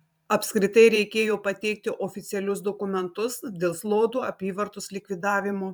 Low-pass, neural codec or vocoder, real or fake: 19.8 kHz; vocoder, 44.1 kHz, 128 mel bands every 512 samples, BigVGAN v2; fake